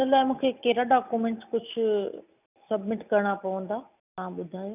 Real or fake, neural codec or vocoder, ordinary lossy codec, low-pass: real; none; none; 3.6 kHz